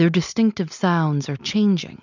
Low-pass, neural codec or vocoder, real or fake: 7.2 kHz; none; real